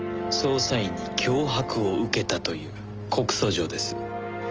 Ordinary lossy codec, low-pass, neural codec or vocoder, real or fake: Opus, 24 kbps; 7.2 kHz; none; real